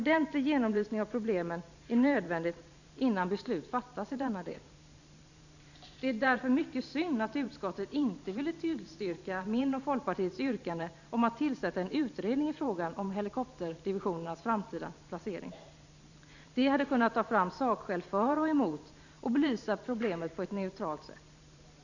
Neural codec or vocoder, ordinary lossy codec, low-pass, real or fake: vocoder, 44.1 kHz, 128 mel bands every 512 samples, BigVGAN v2; none; 7.2 kHz; fake